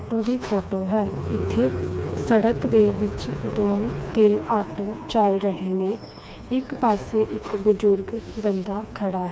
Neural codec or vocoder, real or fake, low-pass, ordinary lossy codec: codec, 16 kHz, 2 kbps, FreqCodec, smaller model; fake; none; none